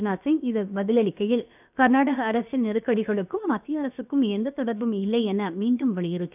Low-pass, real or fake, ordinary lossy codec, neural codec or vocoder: 3.6 kHz; fake; none; codec, 16 kHz, about 1 kbps, DyCAST, with the encoder's durations